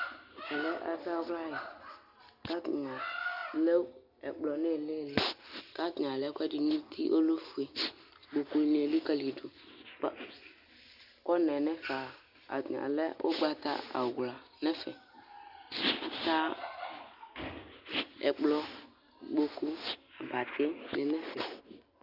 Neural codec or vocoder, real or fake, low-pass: none; real; 5.4 kHz